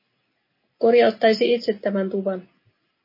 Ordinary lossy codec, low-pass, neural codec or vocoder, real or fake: MP3, 24 kbps; 5.4 kHz; none; real